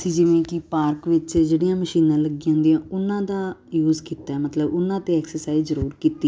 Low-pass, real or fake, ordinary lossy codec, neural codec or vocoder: none; real; none; none